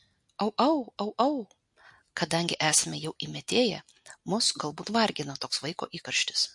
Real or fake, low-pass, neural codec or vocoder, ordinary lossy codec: real; 10.8 kHz; none; MP3, 48 kbps